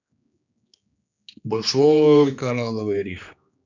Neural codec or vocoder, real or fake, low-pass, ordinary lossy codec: codec, 16 kHz, 2 kbps, X-Codec, HuBERT features, trained on general audio; fake; 7.2 kHz; none